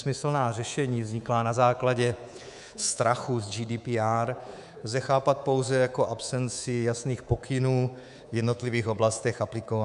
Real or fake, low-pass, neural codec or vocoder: fake; 10.8 kHz; codec, 24 kHz, 3.1 kbps, DualCodec